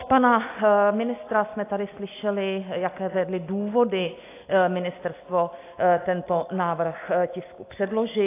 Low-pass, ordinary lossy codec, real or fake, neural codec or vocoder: 3.6 kHz; AAC, 24 kbps; real; none